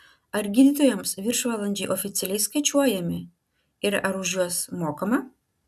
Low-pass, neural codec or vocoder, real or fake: 14.4 kHz; none; real